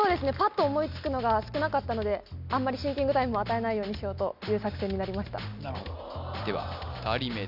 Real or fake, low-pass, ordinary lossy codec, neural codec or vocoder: real; 5.4 kHz; none; none